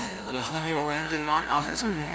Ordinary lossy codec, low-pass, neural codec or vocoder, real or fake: none; none; codec, 16 kHz, 0.5 kbps, FunCodec, trained on LibriTTS, 25 frames a second; fake